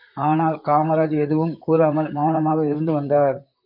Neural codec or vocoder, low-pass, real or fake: vocoder, 44.1 kHz, 128 mel bands, Pupu-Vocoder; 5.4 kHz; fake